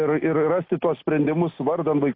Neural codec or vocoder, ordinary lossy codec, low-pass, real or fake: none; AAC, 32 kbps; 5.4 kHz; real